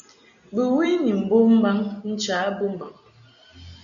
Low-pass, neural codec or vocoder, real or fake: 7.2 kHz; none; real